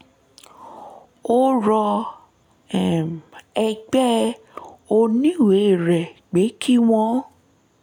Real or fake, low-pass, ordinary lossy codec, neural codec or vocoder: real; 19.8 kHz; none; none